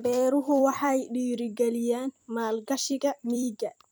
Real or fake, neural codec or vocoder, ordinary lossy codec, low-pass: fake; vocoder, 44.1 kHz, 128 mel bands every 256 samples, BigVGAN v2; none; none